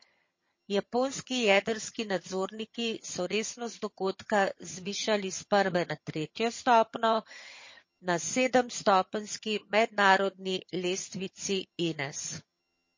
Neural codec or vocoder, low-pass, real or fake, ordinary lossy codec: vocoder, 22.05 kHz, 80 mel bands, HiFi-GAN; 7.2 kHz; fake; MP3, 32 kbps